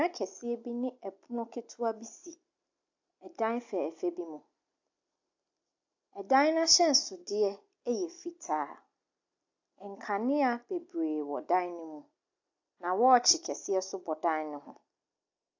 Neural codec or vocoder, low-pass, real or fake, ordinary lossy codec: none; 7.2 kHz; real; AAC, 48 kbps